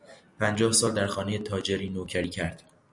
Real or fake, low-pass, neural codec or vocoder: real; 10.8 kHz; none